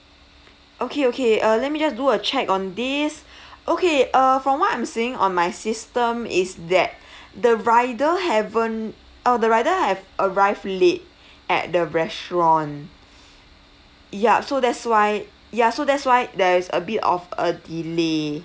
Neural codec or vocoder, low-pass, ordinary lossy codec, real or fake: none; none; none; real